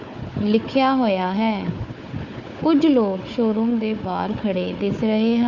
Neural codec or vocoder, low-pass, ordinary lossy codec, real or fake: codec, 16 kHz, 4 kbps, FunCodec, trained on Chinese and English, 50 frames a second; 7.2 kHz; none; fake